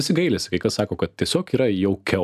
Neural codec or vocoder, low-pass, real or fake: none; 14.4 kHz; real